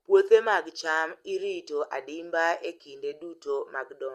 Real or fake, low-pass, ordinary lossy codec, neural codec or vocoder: real; 14.4 kHz; Opus, 32 kbps; none